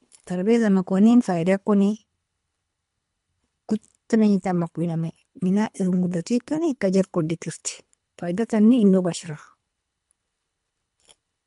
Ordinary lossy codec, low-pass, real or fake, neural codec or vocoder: MP3, 64 kbps; 10.8 kHz; fake; codec, 24 kHz, 3 kbps, HILCodec